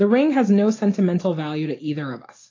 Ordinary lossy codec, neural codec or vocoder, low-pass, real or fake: AAC, 32 kbps; none; 7.2 kHz; real